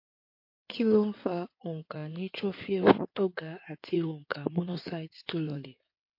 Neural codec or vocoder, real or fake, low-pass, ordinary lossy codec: codec, 16 kHz in and 24 kHz out, 2.2 kbps, FireRedTTS-2 codec; fake; 5.4 kHz; MP3, 32 kbps